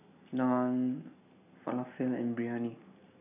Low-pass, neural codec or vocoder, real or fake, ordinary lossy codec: 3.6 kHz; none; real; none